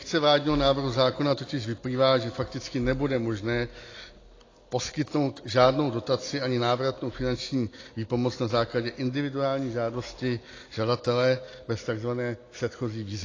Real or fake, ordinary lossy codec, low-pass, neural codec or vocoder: real; AAC, 32 kbps; 7.2 kHz; none